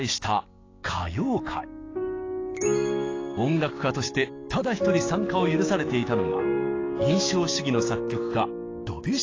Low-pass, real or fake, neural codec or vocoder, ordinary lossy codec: 7.2 kHz; fake; codec, 16 kHz, 6 kbps, DAC; AAC, 32 kbps